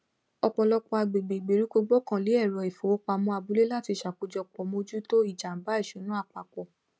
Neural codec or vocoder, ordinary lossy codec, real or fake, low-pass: none; none; real; none